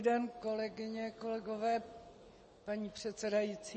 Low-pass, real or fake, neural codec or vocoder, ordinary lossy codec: 9.9 kHz; real; none; MP3, 32 kbps